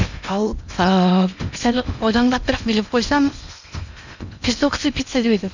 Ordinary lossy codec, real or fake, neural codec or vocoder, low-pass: none; fake; codec, 16 kHz in and 24 kHz out, 0.8 kbps, FocalCodec, streaming, 65536 codes; 7.2 kHz